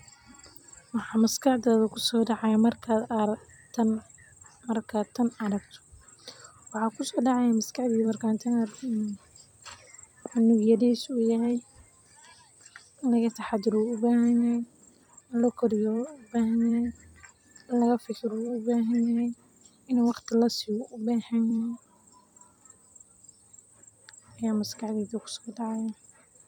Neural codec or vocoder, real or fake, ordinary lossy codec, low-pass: vocoder, 44.1 kHz, 128 mel bands every 256 samples, BigVGAN v2; fake; none; 19.8 kHz